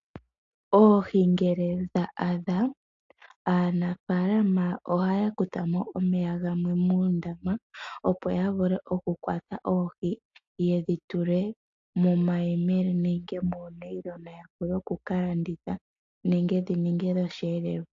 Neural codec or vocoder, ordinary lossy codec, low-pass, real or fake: none; AAC, 48 kbps; 7.2 kHz; real